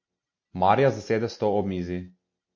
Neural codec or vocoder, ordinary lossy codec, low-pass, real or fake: none; MP3, 32 kbps; 7.2 kHz; real